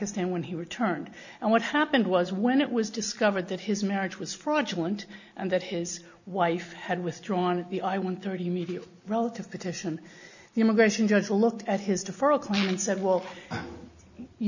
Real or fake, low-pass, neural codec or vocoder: real; 7.2 kHz; none